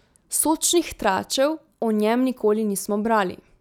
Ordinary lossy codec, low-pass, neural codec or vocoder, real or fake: none; 19.8 kHz; none; real